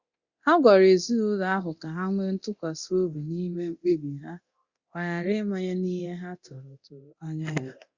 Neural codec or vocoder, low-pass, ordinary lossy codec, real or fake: codec, 24 kHz, 0.9 kbps, DualCodec; 7.2 kHz; Opus, 64 kbps; fake